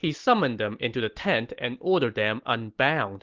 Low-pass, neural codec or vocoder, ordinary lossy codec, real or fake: 7.2 kHz; none; Opus, 16 kbps; real